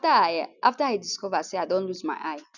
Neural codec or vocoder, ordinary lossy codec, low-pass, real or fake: none; none; 7.2 kHz; real